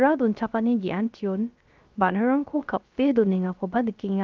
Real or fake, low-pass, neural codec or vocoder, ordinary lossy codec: fake; 7.2 kHz; codec, 16 kHz, 0.3 kbps, FocalCodec; Opus, 24 kbps